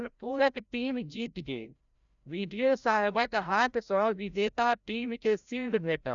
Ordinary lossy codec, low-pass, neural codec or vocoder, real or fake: none; 7.2 kHz; codec, 16 kHz, 0.5 kbps, FreqCodec, larger model; fake